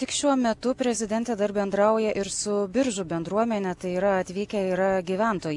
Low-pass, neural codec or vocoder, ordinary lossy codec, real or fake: 10.8 kHz; none; AAC, 64 kbps; real